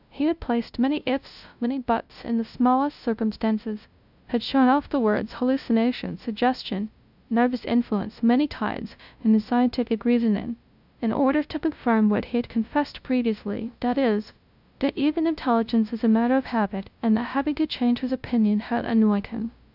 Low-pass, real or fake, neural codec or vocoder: 5.4 kHz; fake; codec, 16 kHz, 0.5 kbps, FunCodec, trained on LibriTTS, 25 frames a second